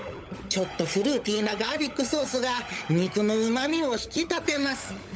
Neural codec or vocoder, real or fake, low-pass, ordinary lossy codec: codec, 16 kHz, 16 kbps, FunCodec, trained on LibriTTS, 50 frames a second; fake; none; none